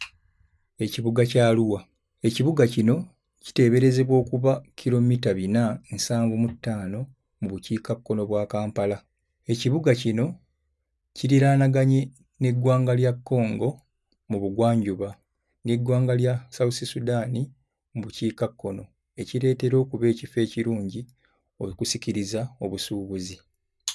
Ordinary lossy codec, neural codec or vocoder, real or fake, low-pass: none; none; real; none